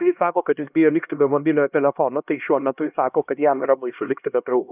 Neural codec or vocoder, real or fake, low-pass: codec, 16 kHz, 1 kbps, X-Codec, HuBERT features, trained on LibriSpeech; fake; 3.6 kHz